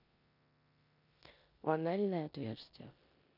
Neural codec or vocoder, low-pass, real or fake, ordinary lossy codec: codec, 16 kHz in and 24 kHz out, 0.9 kbps, LongCat-Audio-Codec, four codebook decoder; 5.4 kHz; fake; none